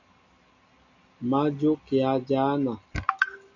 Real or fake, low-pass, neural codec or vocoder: real; 7.2 kHz; none